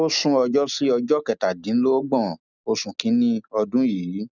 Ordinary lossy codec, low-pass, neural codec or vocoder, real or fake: none; 7.2 kHz; none; real